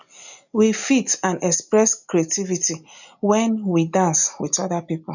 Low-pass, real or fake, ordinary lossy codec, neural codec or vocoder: 7.2 kHz; real; none; none